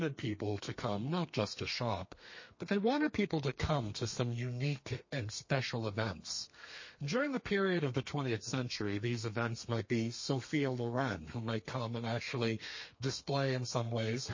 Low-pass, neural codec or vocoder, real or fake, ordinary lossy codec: 7.2 kHz; codec, 32 kHz, 1.9 kbps, SNAC; fake; MP3, 32 kbps